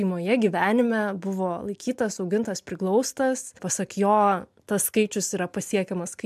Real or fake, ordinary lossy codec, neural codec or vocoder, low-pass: real; MP3, 96 kbps; none; 14.4 kHz